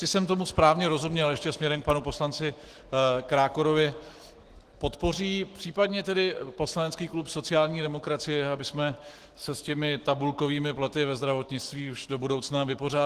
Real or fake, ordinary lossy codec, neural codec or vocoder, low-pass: fake; Opus, 16 kbps; autoencoder, 48 kHz, 128 numbers a frame, DAC-VAE, trained on Japanese speech; 14.4 kHz